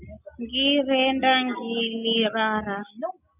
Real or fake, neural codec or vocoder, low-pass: real; none; 3.6 kHz